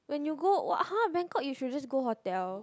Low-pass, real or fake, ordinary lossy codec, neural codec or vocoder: none; real; none; none